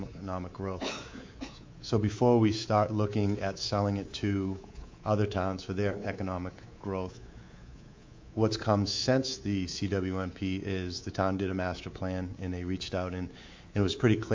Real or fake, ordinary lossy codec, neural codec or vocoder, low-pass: fake; MP3, 48 kbps; codec, 24 kHz, 3.1 kbps, DualCodec; 7.2 kHz